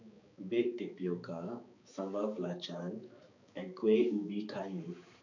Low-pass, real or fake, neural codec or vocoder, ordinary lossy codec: 7.2 kHz; fake; codec, 16 kHz, 4 kbps, X-Codec, HuBERT features, trained on balanced general audio; none